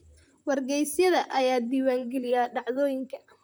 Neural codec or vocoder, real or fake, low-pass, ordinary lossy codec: vocoder, 44.1 kHz, 128 mel bands, Pupu-Vocoder; fake; none; none